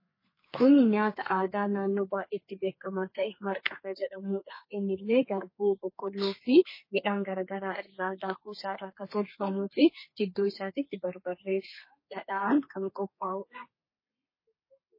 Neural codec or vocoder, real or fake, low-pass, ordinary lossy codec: codec, 32 kHz, 1.9 kbps, SNAC; fake; 5.4 kHz; MP3, 24 kbps